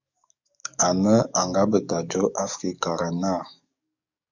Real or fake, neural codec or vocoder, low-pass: fake; codec, 44.1 kHz, 7.8 kbps, DAC; 7.2 kHz